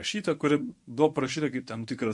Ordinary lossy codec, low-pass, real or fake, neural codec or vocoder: MP3, 48 kbps; 10.8 kHz; fake; codec, 24 kHz, 0.9 kbps, WavTokenizer, medium speech release version 1